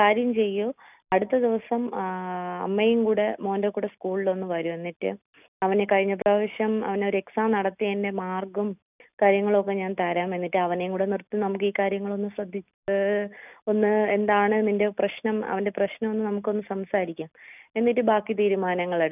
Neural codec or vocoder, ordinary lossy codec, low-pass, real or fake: none; none; 3.6 kHz; real